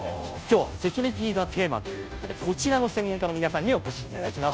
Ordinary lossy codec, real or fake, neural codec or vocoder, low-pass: none; fake; codec, 16 kHz, 0.5 kbps, FunCodec, trained on Chinese and English, 25 frames a second; none